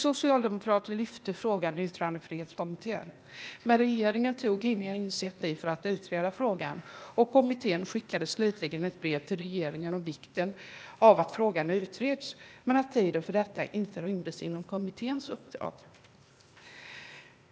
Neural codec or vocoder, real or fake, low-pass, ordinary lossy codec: codec, 16 kHz, 0.8 kbps, ZipCodec; fake; none; none